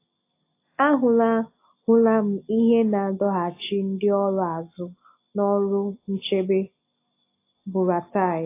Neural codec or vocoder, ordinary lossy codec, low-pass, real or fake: none; AAC, 24 kbps; 3.6 kHz; real